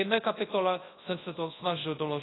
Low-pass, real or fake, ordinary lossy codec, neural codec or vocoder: 7.2 kHz; fake; AAC, 16 kbps; codec, 24 kHz, 0.9 kbps, WavTokenizer, large speech release